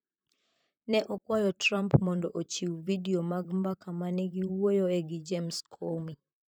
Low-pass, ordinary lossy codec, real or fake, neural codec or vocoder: none; none; fake; vocoder, 44.1 kHz, 128 mel bands, Pupu-Vocoder